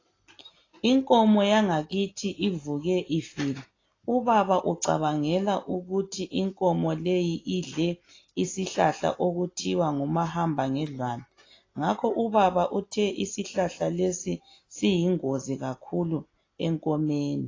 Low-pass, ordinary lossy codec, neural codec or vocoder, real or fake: 7.2 kHz; AAC, 32 kbps; none; real